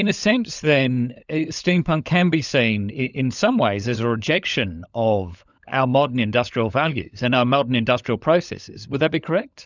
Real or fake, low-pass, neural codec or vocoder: fake; 7.2 kHz; codec, 16 kHz, 16 kbps, FunCodec, trained on LibriTTS, 50 frames a second